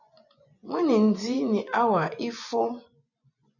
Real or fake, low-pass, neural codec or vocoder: fake; 7.2 kHz; vocoder, 24 kHz, 100 mel bands, Vocos